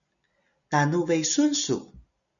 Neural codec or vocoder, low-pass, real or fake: none; 7.2 kHz; real